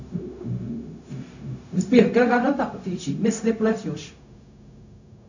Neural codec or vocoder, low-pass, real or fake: codec, 16 kHz, 0.4 kbps, LongCat-Audio-Codec; 7.2 kHz; fake